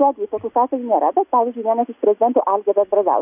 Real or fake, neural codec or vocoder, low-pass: real; none; 3.6 kHz